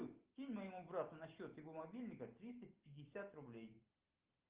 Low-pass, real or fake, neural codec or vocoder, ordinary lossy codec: 3.6 kHz; real; none; Opus, 32 kbps